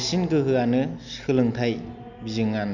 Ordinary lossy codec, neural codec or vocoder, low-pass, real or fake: none; none; 7.2 kHz; real